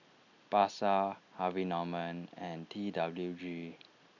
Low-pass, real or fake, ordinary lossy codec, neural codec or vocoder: 7.2 kHz; real; none; none